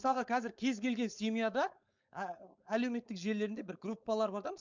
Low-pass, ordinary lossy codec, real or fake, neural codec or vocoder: 7.2 kHz; MP3, 64 kbps; fake; codec, 16 kHz, 4.8 kbps, FACodec